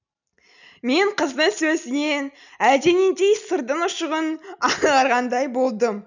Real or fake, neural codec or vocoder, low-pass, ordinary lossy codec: real; none; 7.2 kHz; none